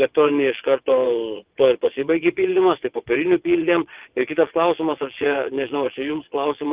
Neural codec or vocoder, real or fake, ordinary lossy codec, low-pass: vocoder, 22.05 kHz, 80 mel bands, WaveNeXt; fake; Opus, 32 kbps; 3.6 kHz